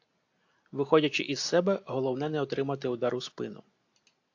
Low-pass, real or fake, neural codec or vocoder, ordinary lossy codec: 7.2 kHz; real; none; AAC, 48 kbps